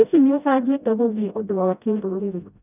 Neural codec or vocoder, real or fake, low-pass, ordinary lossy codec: codec, 16 kHz, 0.5 kbps, FreqCodec, smaller model; fake; 3.6 kHz; none